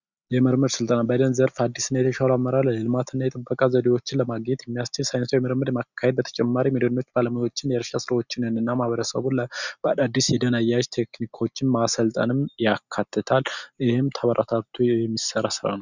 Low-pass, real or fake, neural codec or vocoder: 7.2 kHz; real; none